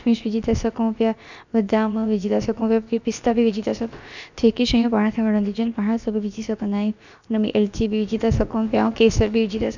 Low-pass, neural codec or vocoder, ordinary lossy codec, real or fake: 7.2 kHz; codec, 16 kHz, about 1 kbps, DyCAST, with the encoder's durations; none; fake